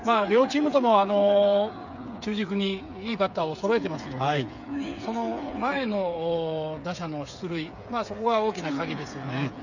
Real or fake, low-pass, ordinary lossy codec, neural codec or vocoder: fake; 7.2 kHz; none; codec, 16 kHz, 8 kbps, FreqCodec, smaller model